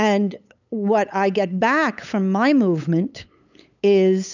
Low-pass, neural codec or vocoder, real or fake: 7.2 kHz; codec, 16 kHz, 8 kbps, FunCodec, trained on LibriTTS, 25 frames a second; fake